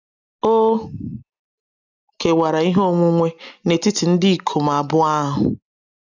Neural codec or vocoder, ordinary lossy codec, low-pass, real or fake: none; none; 7.2 kHz; real